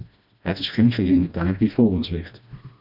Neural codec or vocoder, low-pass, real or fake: codec, 16 kHz, 1 kbps, FreqCodec, smaller model; 5.4 kHz; fake